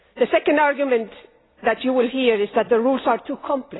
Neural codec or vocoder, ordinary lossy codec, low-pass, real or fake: none; AAC, 16 kbps; 7.2 kHz; real